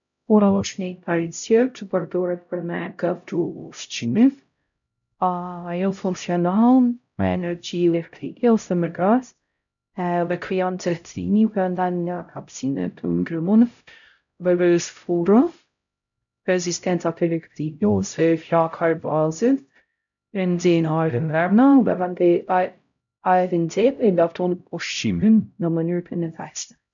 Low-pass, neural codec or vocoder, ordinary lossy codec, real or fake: 7.2 kHz; codec, 16 kHz, 0.5 kbps, X-Codec, HuBERT features, trained on LibriSpeech; none; fake